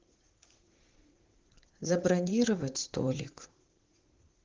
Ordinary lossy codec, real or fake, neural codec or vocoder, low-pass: Opus, 16 kbps; fake; vocoder, 44.1 kHz, 80 mel bands, Vocos; 7.2 kHz